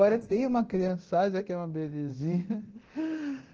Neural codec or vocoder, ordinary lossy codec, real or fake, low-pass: codec, 24 kHz, 0.9 kbps, DualCodec; Opus, 16 kbps; fake; 7.2 kHz